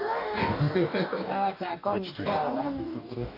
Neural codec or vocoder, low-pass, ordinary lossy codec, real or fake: codec, 44.1 kHz, 2.6 kbps, DAC; 5.4 kHz; none; fake